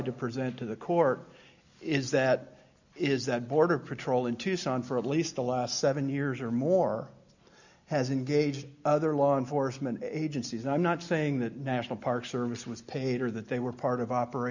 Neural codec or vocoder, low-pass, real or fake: none; 7.2 kHz; real